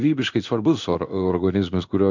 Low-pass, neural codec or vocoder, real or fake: 7.2 kHz; codec, 16 kHz in and 24 kHz out, 1 kbps, XY-Tokenizer; fake